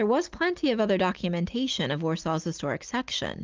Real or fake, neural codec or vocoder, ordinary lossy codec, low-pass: real; none; Opus, 32 kbps; 7.2 kHz